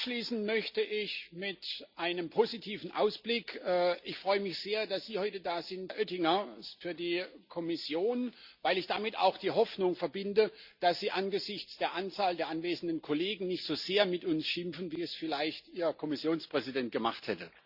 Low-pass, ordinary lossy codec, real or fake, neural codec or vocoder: 5.4 kHz; Opus, 64 kbps; real; none